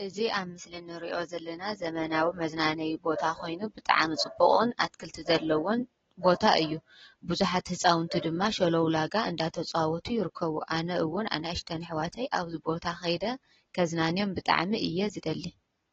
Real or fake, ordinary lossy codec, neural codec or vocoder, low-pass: real; AAC, 24 kbps; none; 7.2 kHz